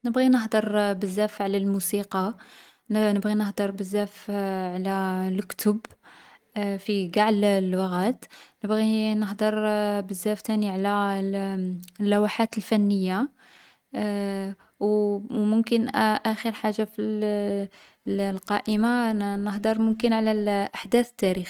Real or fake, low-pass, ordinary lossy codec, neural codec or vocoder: real; 19.8 kHz; Opus, 24 kbps; none